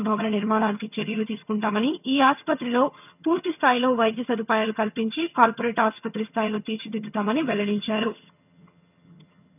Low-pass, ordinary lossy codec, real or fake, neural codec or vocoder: 3.6 kHz; none; fake; vocoder, 22.05 kHz, 80 mel bands, HiFi-GAN